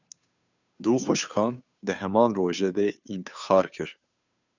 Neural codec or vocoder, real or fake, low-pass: codec, 16 kHz, 2 kbps, FunCodec, trained on Chinese and English, 25 frames a second; fake; 7.2 kHz